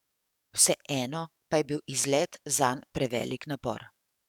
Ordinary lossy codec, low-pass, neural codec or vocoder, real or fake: none; 19.8 kHz; codec, 44.1 kHz, 7.8 kbps, DAC; fake